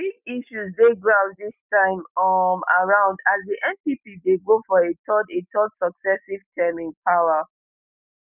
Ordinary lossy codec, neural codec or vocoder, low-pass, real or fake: none; none; 3.6 kHz; real